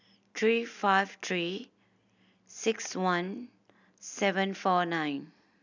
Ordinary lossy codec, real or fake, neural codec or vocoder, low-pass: none; real; none; 7.2 kHz